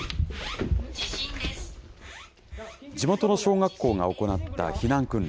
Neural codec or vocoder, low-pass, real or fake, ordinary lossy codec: none; none; real; none